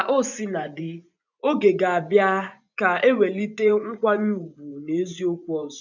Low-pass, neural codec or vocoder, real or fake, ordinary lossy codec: 7.2 kHz; vocoder, 24 kHz, 100 mel bands, Vocos; fake; none